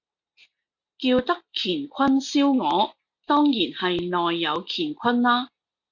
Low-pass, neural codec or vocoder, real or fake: 7.2 kHz; none; real